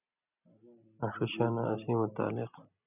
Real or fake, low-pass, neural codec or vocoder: real; 3.6 kHz; none